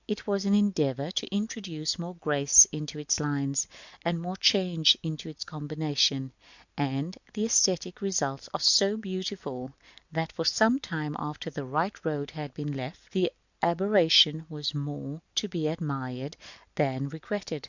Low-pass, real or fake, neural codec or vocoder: 7.2 kHz; real; none